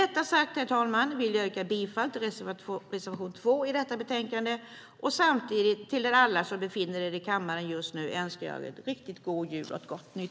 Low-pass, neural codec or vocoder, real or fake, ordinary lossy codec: none; none; real; none